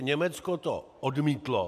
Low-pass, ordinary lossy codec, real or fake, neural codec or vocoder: 14.4 kHz; MP3, 96 kbps; real; none